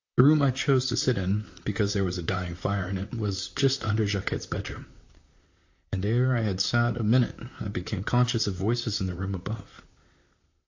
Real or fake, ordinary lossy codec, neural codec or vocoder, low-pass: fake; AAC, 48 kbps; vocoder, 44.1 kHz, 128 mel bands, Pupu-Vocoder; 7.2 kHz